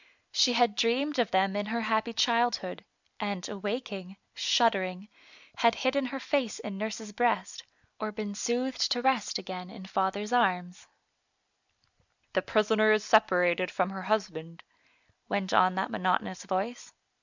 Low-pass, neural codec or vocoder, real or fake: 7.2 kHz; none; real